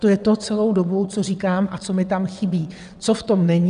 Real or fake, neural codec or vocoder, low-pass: fake; vocoder, 22.05 kHz, 80 mel bands, WaveNeXt; 9.9 kHz